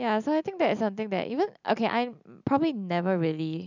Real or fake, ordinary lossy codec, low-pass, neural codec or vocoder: real; none; 7.2 kHz; none